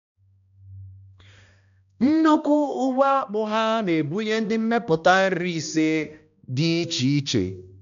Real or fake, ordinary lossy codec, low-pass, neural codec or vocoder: fake; none; 7.2 kHz; codec, 16 kHz, 1 kbps, X-Codec, HuBERT features, trained on balanced general audio